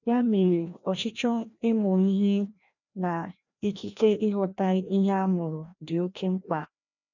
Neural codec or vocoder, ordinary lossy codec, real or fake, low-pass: codec, 16 kHz, 1 kbps, FreqCodec, larger model; none; fake; 7.2 kHz